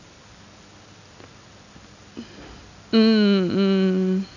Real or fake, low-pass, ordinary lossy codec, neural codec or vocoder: real; 7.2 kHz; none; none